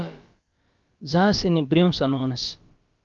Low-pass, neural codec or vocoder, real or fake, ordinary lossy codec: 7.2 kHz; codec, 16 kHz, about 1 kbps, DyCAST, with the encoder's durations; fake; Opus, 32 kbps